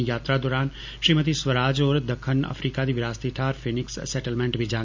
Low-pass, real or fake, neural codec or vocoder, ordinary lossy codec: 7.2 kHz; real; none; MP3, 64 kbps